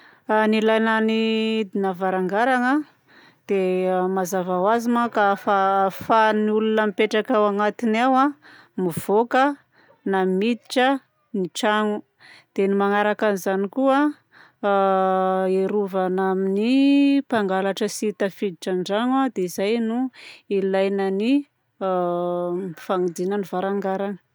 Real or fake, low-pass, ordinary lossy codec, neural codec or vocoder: real; none; none; none